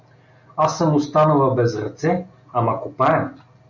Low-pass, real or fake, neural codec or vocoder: 7.2 kHz; real; none